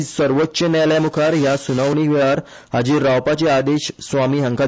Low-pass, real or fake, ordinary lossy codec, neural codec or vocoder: none; real; none; none